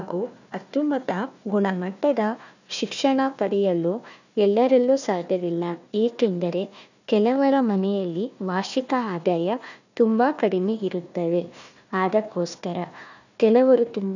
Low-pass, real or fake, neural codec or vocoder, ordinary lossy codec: 7.2 kHz; fake; codec, 16 kHz, 1 kbps, FunCodec, trained on Chinese and English, 50 frames a second; none